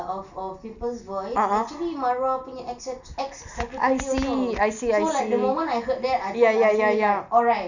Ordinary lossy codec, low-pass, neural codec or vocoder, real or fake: none; 7.2 kHz; none; real